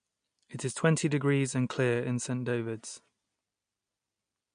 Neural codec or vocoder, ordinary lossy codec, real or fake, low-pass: none; MP3, 64 kbps; real; 9.9 kHz